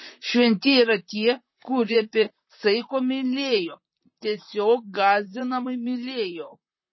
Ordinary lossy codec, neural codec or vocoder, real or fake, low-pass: MP3, 24 kbps; vocoder, 44.1 kHz, 128 mel bands, Pupu-Vocoder; fake; 7.2 kHz